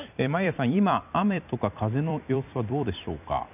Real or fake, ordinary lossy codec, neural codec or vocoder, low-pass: fake; none; vocoder, 44.1 kHz, 128 mel bands every 256 samples, BigVGAN v2; 3.6 kHz